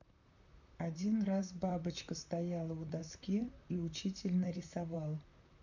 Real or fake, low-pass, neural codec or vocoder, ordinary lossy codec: fake; 7.2 kHz; vocoder, 44.1 kHz, 128 mel bands, Pupu-Vocoder; none